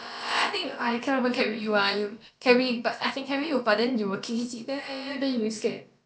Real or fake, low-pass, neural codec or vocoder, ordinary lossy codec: fake; none; codec, 16 kHz, about 1 kbps, DyCAST, with the encoder's durations; none